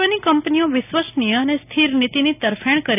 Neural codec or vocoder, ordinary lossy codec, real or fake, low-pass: none; none; real; 3.6 kHz